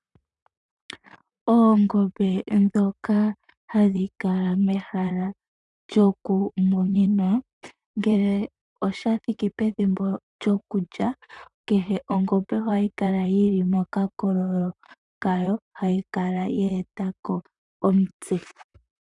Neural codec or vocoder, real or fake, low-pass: vocoder, 44.1 kHz, 128 mel bands, Pupu-Vocoder; fake; 10.8 kHz